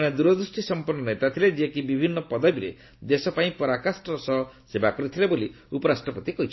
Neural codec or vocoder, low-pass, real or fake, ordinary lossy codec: none; 7.2 kHz; real; MP3, 24 kbps